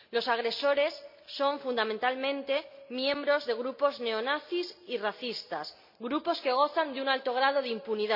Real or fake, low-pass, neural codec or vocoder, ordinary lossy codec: real; 5.4 kHz; none; MP3, 48 kbps